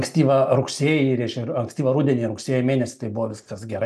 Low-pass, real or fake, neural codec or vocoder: 14.4 kHz; real; none